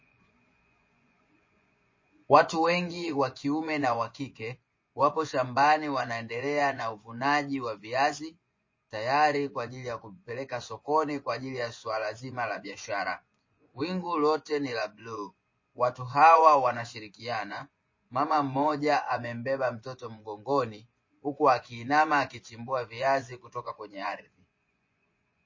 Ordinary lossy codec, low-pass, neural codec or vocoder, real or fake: MP3, 32 kbps; 7.2 kHz; vocoder, 24 kHz, 100 mel bands, Vocos; fake